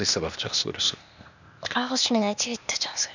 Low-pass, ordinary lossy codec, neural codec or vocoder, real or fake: 7.2 kHz; none; codec, 16 kHz, 0.8 kbps, ZipCodec; fake